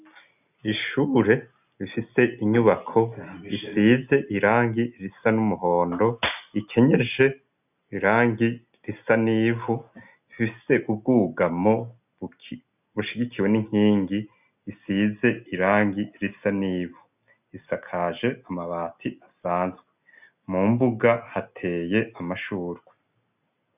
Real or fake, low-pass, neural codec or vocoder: real; 3.6 kHz; none